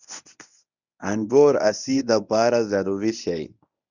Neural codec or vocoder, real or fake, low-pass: codec, 24 kHz, 0.9 kbps, WavTokenizer, medium speech release version 1; fake; 7.2 kHz